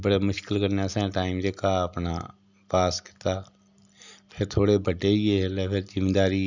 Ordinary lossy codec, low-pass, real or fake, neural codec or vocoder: Opus, 64 kbps; 7.2 kHz; real; none